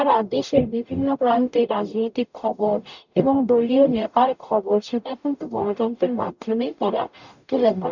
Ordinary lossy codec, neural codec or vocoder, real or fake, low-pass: none; codec, 44.1 kHz, 0.9 kbps, DAC; fake; 7.2 kHz